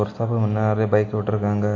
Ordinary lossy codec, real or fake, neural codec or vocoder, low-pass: AAC, 48 kbps; real; none; 7.2 kHz